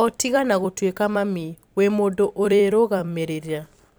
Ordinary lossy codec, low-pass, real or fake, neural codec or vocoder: none; none; fake; vocoder, 44.1 kHz, 128 mel bands every 256 samples, BigVGAN v2